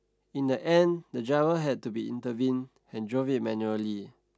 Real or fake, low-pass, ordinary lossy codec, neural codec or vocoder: real; none; none; none